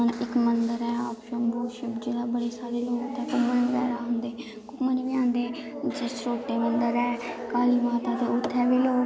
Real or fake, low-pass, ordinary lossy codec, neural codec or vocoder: real; none; none; none